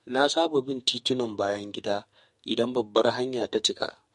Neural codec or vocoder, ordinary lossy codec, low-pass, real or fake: codec, 44.1 kHz, 2.6 kbps, SNAC; MP3, 48 kbps; 14.4 kHz; fake